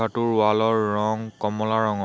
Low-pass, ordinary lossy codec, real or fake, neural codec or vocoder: none; none; real; none